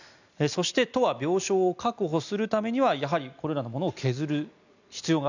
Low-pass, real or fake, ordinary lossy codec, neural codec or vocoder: 7.2 kHz; real; none; none